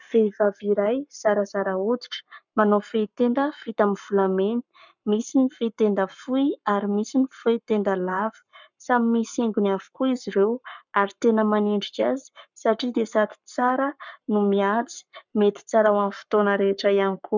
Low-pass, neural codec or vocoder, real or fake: 7.2 kHz; codec, 44.1 kHz, 7.8 kbps, Pupu-Codec; fake